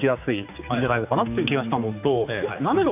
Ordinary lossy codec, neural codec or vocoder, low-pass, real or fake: none; codec, 16 kHz, 4 kbps, X-Codec, HuBERT features, trained on general audio; 3.6 kHz; fake